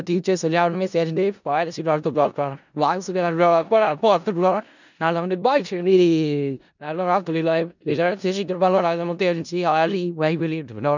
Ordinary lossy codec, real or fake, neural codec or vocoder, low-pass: none; fake; codec, 16 kHz in and 24 kHz out, 0.4 kbps, LongCat-Audio-Codec, four codebook decoder; 7.2 kHz